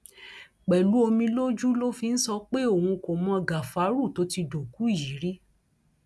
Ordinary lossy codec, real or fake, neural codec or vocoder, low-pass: none; real; none; none